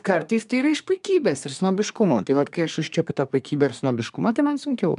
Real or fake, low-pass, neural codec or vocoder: fake; 10.8 kHz; codec, 24 kHz, 1 kbps, SNAC